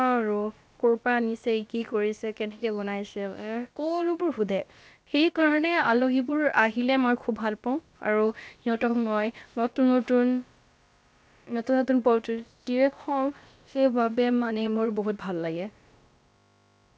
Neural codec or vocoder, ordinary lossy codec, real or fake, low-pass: codec, 16 kHz, about 1 kbps, DyCAST, with the encoder's durations; none; fake; none